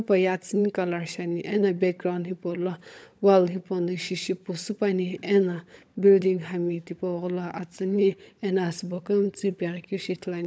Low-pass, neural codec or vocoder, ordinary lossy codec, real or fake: none; codec, 16 kHz, 16 kbps, FunCodec, trained on LibriTTS, 50 frames a second; none; fake